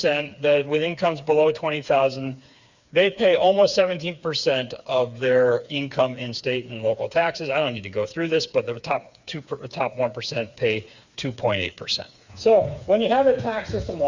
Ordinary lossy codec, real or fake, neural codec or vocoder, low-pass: Opus, 64 kbps; fake; codec, 16 kHz, 4 kbps, FreqCodec, smaller model; 7.2 kHz